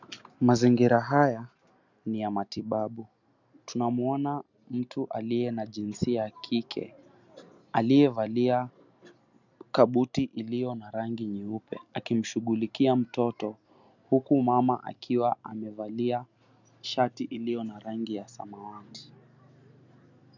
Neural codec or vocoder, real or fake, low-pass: none; real; 7.2 kHz